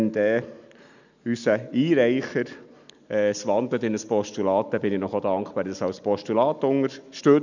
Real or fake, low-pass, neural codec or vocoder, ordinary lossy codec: real; 7.2 kHz; none; none